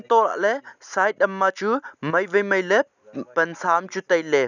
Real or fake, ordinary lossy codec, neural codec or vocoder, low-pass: real; none; none; 7.2 kHz